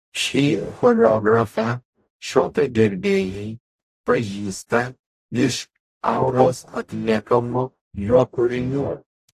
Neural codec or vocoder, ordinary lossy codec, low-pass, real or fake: codec, 44.1 kHz, 0.9 kbps, DAC; AAC, 64 kbps; 14.4 kHz; fake